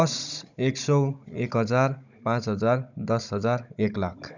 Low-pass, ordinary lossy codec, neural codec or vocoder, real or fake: 7.2 kHz; none; codec, 16 kHz, 16 kbps, FunCodec, trained on Chinese and English, 50 frames a second; fake